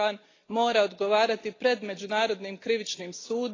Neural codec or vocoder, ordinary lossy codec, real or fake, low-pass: none; AAC, 32 kbps; real; 7.2 kHz